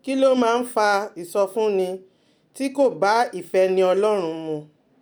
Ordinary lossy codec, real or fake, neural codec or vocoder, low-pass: none; real; none; none